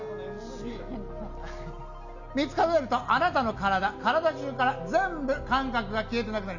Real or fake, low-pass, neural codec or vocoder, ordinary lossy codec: real; 7.2 kHz; none; none